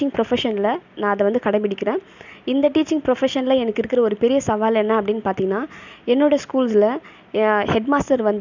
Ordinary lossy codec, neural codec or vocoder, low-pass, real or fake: none; none; 7.2 kHz; real